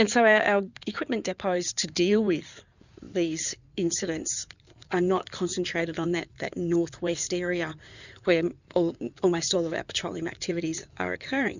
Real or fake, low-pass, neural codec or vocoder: fake; 7.2 kHz; codec, 16 kHz in and 24 kHz out, 2.2 kbps, FireRedTTS-2 codec